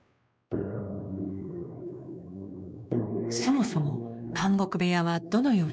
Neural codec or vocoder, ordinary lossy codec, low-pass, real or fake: codec, 16 kHz, 2 kbps, X-Codec, WavLM features, trained on Multilingual LibriSpeech; none; none; fake